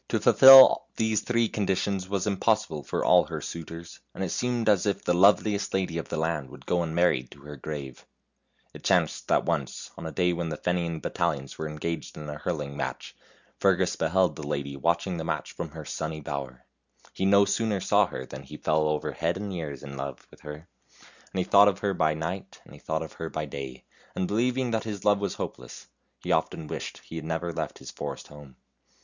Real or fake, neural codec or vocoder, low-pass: real; none; 7.2 kHz